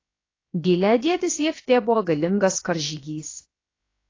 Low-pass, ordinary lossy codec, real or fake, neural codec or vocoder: 7.2 kHz; AAC, 32 kbps; fake; codec, 16 kHz, 0.7 kbps, FocalCodec